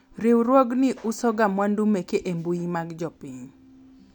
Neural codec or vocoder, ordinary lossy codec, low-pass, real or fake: none; none; 19.8 kHz; real